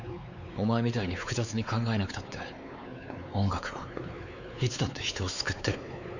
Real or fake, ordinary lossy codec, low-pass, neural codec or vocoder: fake; none; 7.2 kHz; codec, 16 kHz, 4 kbps, X-Codec, WavLM features, trained on Multilingual LibriSpeech